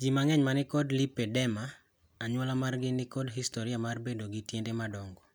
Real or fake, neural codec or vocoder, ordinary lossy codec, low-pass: real; none; none; none